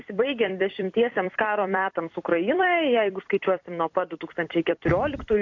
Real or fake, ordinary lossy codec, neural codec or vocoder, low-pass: fake; AAC, 48 kbps; vocoder, 44.1 kHz, 128 mel bands every 256 samples, BigVGAN v2; 7.2 kHz